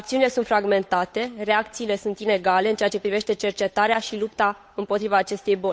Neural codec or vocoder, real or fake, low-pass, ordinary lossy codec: codec, 16 kHz, 8 kbps, FunCodec, trained on Chinese and English, 25 frames a second; fake; none; none